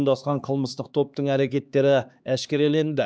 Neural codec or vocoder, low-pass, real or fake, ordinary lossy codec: codec, 16 kHz, 2 kbps, X-Codec, HuBERT features, trained on LibriSpeech; none; fake; none